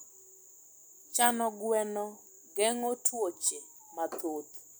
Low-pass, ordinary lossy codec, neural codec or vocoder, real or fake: none; none; none; real